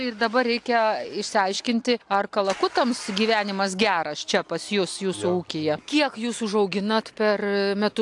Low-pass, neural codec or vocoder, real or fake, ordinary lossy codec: 10.8 kHz; none; real; AAC, 64 kbps